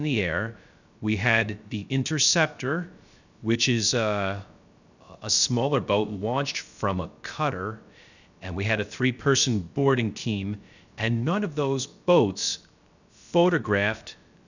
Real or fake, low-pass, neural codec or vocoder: fake; 7.2 kHz; codec, 16 kHz, 0.3 kbps, FocalCodec